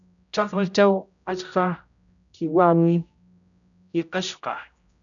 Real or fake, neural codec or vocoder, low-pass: fake; codec, 16 kHz, 0.5 kbps, X-Codec, HuBERT features, trained on general audio; 7.2 kHz